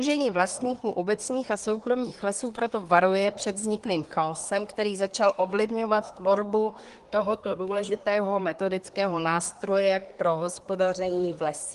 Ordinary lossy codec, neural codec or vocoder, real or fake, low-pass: Opus, 24 kbps; codec, 24 kHz, 1 kbps, SNAC; fake; 10.8 kHz